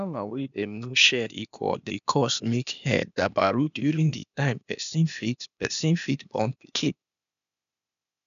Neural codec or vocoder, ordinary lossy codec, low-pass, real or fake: codec, 16 kHz, 0.8 kbps, ZipCodec; none; 7.2 kHz; fake